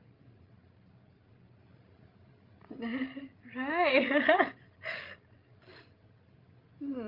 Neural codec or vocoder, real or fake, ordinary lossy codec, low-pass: codec, 16 kHz, 16 kbps, FreqCodec, larger model; fake; Opus, 32 kbps; 5.4 kHz